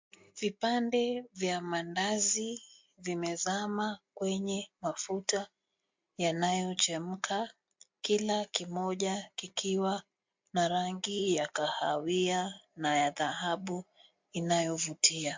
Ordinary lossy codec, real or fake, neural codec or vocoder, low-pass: MP3, 48 kbps; real; none; 7.2 kHz